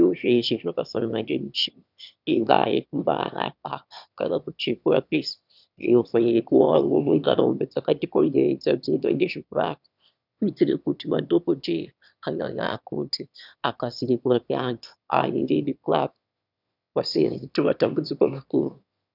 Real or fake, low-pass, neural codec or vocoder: fake; 5.4 kHz; autoencoder, 22.05 kHz, a latent of 192 numbers a frame, VITS, trained on one speaker